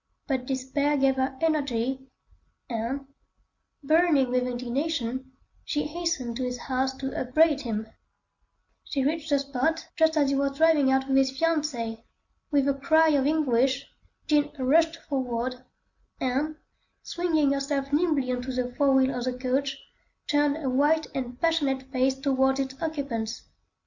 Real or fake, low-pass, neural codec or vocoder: real; 7.2 kHz; none